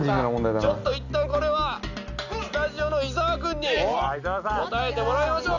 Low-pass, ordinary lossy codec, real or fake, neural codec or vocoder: 7.2 kHz; none; real; none